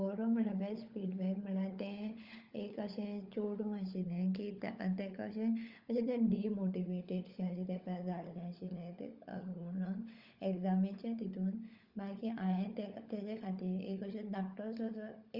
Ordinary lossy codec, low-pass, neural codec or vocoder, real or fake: Opus, 32 kbps; 5.4 kHz; codec, 16 kHz, 16 kbps, FunCodec, trained on LibriTTS, 50 frames a second; fake